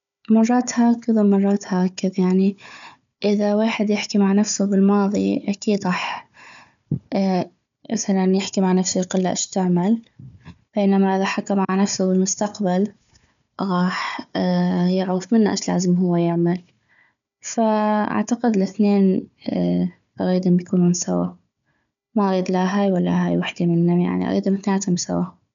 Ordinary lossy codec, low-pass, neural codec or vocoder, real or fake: none; 7.2 kHz; codec, 16 kHz, 4 kbps, FunCodec, trained on Chinese and English, 50 frames a second; fake